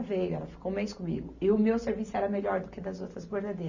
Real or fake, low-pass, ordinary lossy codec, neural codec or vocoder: real; 7.2 kHz; none; none